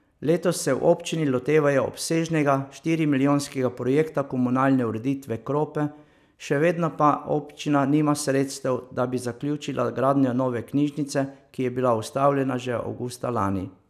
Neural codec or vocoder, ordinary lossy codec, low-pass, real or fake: none; none; 14.4 kHz; real